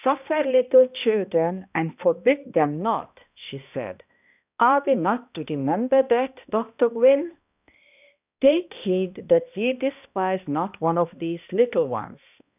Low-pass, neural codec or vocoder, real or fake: 3.6 kHz; codec, 16 kHz, 1 kbps, X-Codec, HuBERT features, trained on balanced general audio; fake